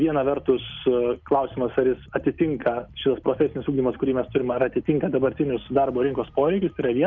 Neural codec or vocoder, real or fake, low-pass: none; real; 7.2 kHz